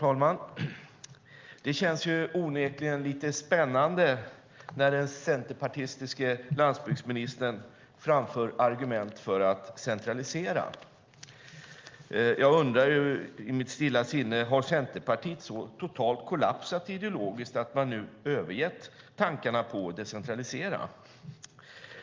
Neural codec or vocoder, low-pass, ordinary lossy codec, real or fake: none; 7.2 kHz; Opus, 24 kbps; real